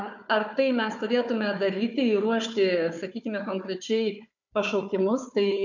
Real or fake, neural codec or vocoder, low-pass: fake; codec, 16 kHz, 4 kbps, FunCodec, trained on Chinese and English, 50 frames a second; 7.2 kHz